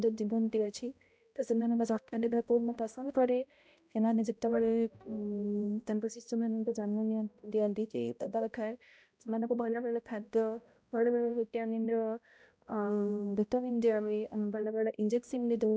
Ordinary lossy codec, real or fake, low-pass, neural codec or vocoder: none; fake; none; codec, 16 kHz, 0.5 kbps, X-Codec, HuBERT features, trained on balanced general audio